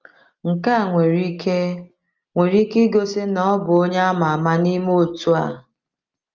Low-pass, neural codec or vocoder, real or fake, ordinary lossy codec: 7.2 kHz; none; real; Opus, 24 kbps